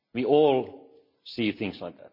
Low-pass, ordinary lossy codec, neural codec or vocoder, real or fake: 5.4 kHz; MP3, 32 kbps; none; real